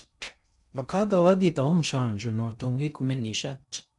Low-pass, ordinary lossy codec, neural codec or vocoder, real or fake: 10.8 kHz; none; codec, 16 kHz in and 24 kHz out, 0.6 kbps, FocalCodec, streaming, 2048 codes; fake